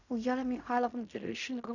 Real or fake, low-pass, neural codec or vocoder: fake; 7.2 kHz; codec, 16 kHz in and 24 kHz out, 0.4 kbps, LongCat-Audio-Codec, fine tuned four codebook decoder